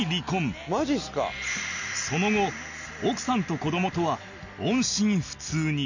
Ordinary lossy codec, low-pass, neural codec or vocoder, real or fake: none; 7.2 kHz; none; real